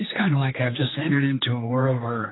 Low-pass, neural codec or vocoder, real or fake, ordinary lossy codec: 7.2 kHz; codec, 16 kHz, 2 kbps, X-Codec, HuBERT features, trained on general audio; fake; AAC, 16 kbps